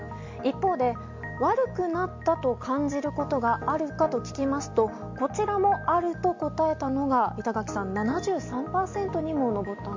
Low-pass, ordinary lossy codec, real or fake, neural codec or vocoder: 7.2 kHz; MP3, 48 kbps; real; none